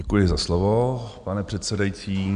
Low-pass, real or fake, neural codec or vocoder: 9.9 kHz; real; none